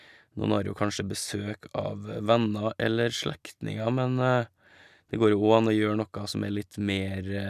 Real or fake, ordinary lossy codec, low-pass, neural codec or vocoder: real; none; 14.4 kHz; none